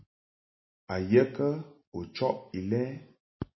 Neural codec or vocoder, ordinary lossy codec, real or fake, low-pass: none; MP3, 24 kbps; real; 7.2 kHz